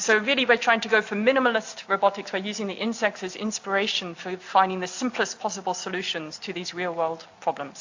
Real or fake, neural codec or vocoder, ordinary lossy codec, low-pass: real; none; AAC, 48 kbps; 7.2 kHz